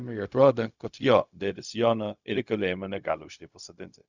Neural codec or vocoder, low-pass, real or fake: codec, 16 kHz, 0.4 kbps, LongCat-Audio-Codec; 7.2 kHz; fake